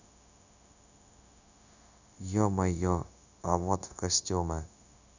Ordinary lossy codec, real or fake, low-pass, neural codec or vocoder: none; fake; 7.2 kHz; codec, 16 kHz, 0.9 kbps, LongCat-Audio-Codec